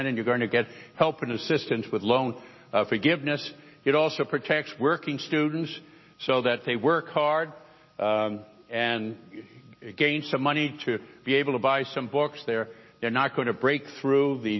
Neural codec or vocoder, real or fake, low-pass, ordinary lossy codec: none; real; 7.2 kHz; MP3, 24 kbps